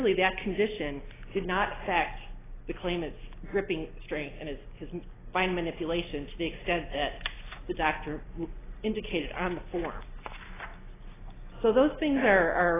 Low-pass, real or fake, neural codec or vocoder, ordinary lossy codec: 3.6 kHz; real; none; AAC, 16 kbps